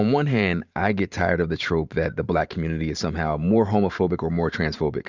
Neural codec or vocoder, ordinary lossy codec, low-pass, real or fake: vocoder, 44.1 kHz, 128 mel bands every 512 samples, BigVGAN v2; Opus, 64 kbps; 7.2 kHz; fake